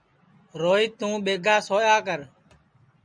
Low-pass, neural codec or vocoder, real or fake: 9.9 kHz; none; real